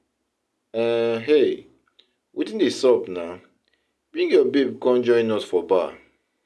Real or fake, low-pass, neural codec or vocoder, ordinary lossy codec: real; none; none; none